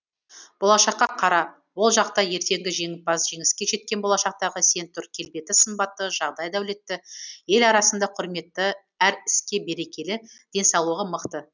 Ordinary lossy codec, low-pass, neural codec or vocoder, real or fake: none; 7.2 kHz; none; real